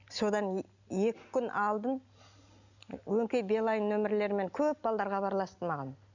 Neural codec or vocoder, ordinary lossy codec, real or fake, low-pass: none; none; real; 7.2 kHz